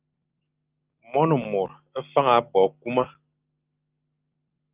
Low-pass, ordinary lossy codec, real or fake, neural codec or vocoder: 3.6 kHz; Opus, 32 kbps; real; none